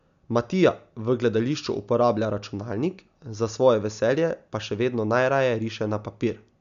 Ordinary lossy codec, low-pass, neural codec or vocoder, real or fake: none; 7.2 kHz; none; real